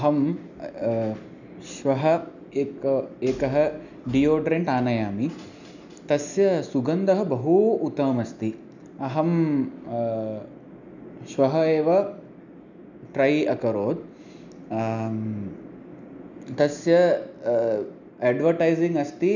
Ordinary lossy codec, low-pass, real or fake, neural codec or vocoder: none; 7.2 kHz; real; none